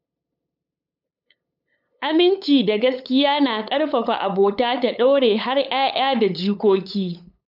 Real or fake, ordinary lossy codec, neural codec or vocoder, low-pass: fake; none; codec, 16 kHz, 8 kbps, FunCodec, trained on LibriTTS, 25 frames a second; 5.4 kHz